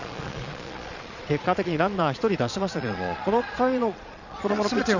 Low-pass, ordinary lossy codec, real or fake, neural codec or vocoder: 7.2 kHz; none; fake; vocoder, 22.05 kHz, 80 mel bands, Vocos